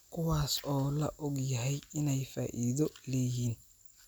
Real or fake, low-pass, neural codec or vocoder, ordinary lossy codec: real; none; none; none